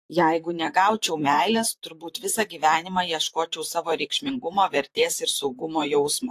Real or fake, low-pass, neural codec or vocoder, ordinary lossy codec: fake; 14.4 kHz; vocoder, 44.1 kHz, 128 mel bands, Pupu-Vocoder; AAC, 64 kbps